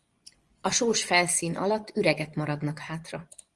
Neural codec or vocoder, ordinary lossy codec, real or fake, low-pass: vocoder, 24 kHz, 100 mel bands, Vocos; Opus, 24 kbps; fake; 10.8 kHz